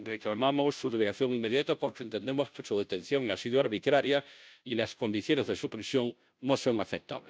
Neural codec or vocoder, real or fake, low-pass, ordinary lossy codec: codec, 16 kHz, 0.5 kbps, FunCodec, trained on Chinese and English, 25 frames a second; fake; none; none